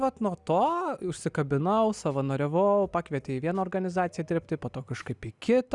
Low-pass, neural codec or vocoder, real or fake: 10.8 kHz; none; real